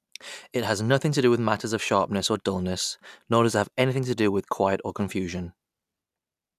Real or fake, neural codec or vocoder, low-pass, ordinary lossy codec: real; none; 14.4 kHz; none